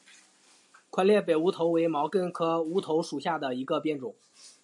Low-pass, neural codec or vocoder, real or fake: 10.8 kHz; none; real